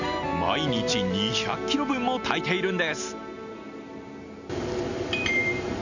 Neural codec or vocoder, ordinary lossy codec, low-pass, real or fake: none; none; 7.2 kHz; real